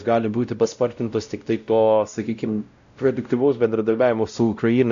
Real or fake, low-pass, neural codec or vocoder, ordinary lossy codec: fake; 7.2 kHz; codec, 16 kHz, 0.5 kbps, X-Codec, WavLM features, trained on Multilingual LibriSpeech; AAC, 96 kbps